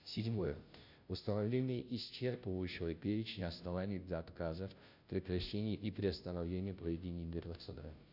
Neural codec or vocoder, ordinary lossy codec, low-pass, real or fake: codec, 16 kHz, 0.5 kbps, FunCodec, trained on Chinese and English, 25 frames a second; none; 5.4 kHz; fake